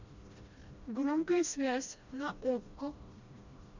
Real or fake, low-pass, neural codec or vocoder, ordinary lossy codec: fake; 7.2 kHz; codec, 16 kHz, 1 kbps, FreqCodec, smaller model; Opus, 64 kbps